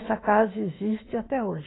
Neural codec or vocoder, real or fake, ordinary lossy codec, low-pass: autoencoder, 48 kHz, 32 numbers a frame, DAC-VAE, trained on Japanese speech; fake; AAC, 16 kbps; 7.2 kHz